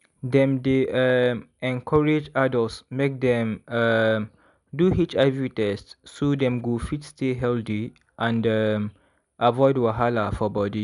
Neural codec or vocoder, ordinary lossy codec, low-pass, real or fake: none; none; 10.8 kHz; real